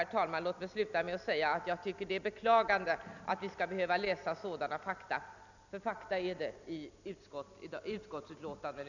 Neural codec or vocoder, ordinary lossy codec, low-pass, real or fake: none; none; 7.2 kHz; real